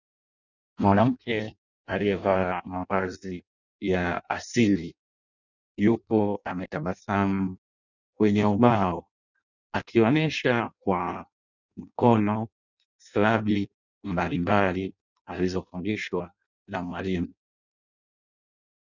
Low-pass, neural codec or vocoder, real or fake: 7.2 kHz; codec, 16 kHz in and 24 kHz out, 0.6 kbps, FireRedTTS-2 codec; fake